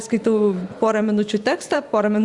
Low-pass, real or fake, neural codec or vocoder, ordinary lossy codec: 10.8 kHz; fake; vocoder, 44.1 kHz, 128 mel bands every 512 samples, BigVGAN v2; Opus, 64 kbps